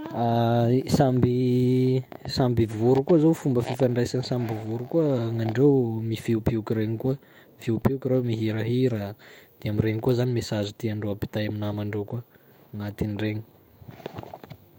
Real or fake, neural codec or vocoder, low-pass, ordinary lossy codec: fake; autoencoder, 48 kHz, 128 numbers a frame, DAC-VAE, trained on Japanese speech; 19.8 kHz; MP3, 64 kbps